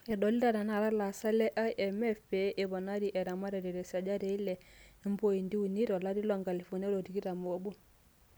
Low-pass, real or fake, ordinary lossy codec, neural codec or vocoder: none; real; none; none